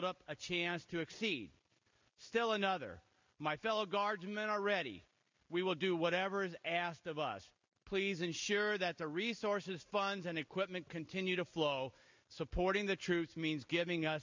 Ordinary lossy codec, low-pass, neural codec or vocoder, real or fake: MP3, 64 kbps; 7.2 kHz; none; real